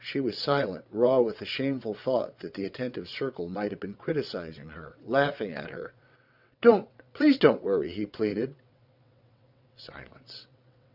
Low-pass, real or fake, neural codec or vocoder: 5.4 kHz; fake; vocoder, 22.05 kHz, 80 mel bands, WaveNeXt